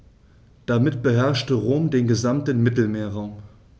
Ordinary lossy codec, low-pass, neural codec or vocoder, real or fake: none; none; none; real